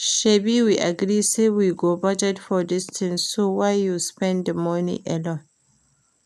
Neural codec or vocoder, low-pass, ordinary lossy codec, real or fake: none; 14.4 kHz; none; real